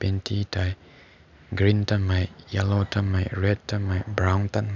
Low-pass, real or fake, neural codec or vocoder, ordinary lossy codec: 7.2 kHz; real; none; none